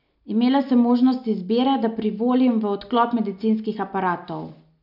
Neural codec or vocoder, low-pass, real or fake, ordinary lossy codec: none; 5.4 kHz; real; none